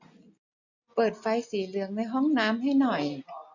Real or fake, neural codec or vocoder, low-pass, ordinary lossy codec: real; none; 7.2 kHz; none